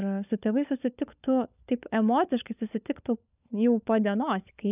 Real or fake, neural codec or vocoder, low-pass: fake; codec, 16 kHz, 16 kbps, FunCodec, trained on LibriTTS, 50 frames a second; 3.6 kHz